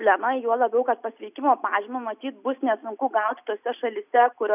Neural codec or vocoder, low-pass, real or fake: none; 3.6 kHz; real